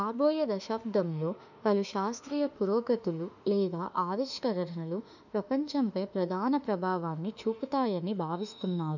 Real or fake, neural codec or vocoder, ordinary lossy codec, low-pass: fake; autoencoder, 48 kHz, 32 numbers a frame, DAC-VAE, trained on Japanese speech; none; 7.2 kHz